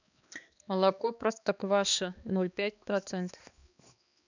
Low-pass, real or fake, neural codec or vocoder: 7.2 kHz; fake; codec, 16 kHz, 2 kbps, X-Codec, HuBERT features, trained on balanced general audio